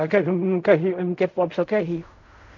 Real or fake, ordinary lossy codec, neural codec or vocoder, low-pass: fake; none; codec, 16 kHz in and 24 kHz out, 0.4 kbps, LongCat-Audio-Codec, fine tuned four codebook decoder; 7.2 kHz